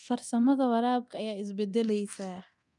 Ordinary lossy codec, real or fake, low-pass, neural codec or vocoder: none; fake; 10.8 kHz; codec, 24 kHz, 0.9 kbps, DualCodec